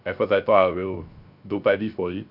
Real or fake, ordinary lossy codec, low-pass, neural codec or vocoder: fake; none; 5.4 kHz; codec, 16 kHz, 0.3 kbps, FocalCodec